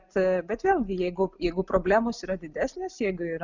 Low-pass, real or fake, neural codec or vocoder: 7.2 kHz; real; none